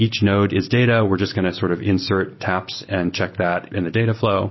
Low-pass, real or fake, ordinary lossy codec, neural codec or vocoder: 7.2 kHz; real; MP3, 24 kbps; none